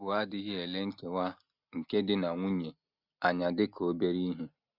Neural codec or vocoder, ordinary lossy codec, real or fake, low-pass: none; none; real; 5.4 kHz